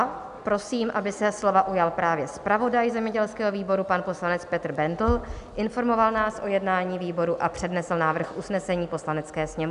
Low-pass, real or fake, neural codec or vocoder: 10.8 kHz; real; none